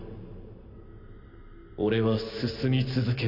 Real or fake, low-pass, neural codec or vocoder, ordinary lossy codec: real; 5.4 kHz; none; none